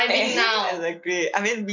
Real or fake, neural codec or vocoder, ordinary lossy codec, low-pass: real; none; none; 7.2 kHz